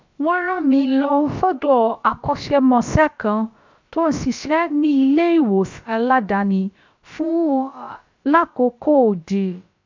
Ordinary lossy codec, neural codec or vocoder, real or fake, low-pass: MP3, 64 kbps; codec, 16 kHz, about 1 kbps, DyCAST, with the encoder's durations; fake; 7.2 kHz